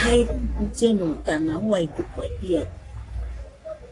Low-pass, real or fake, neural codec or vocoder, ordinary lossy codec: 10.8 kHz; fake; codec, 44.1 kHz, 3.4 kbps, Pupu-Codec; AAC, 48 kbps